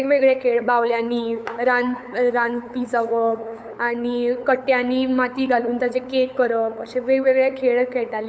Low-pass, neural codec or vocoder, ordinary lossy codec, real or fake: none; codec, 16 kHz, 8 kbps, FunCodec, trained on LibriTTS, 25 frames a second; none; fake